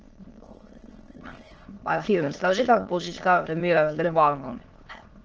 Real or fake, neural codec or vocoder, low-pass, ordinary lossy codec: fake; autoencoder, 22.05 kHz, a latent of 192 numbers a frame, VITS, trained on many speakers; 7.2 kHz; Opus, 16 kbps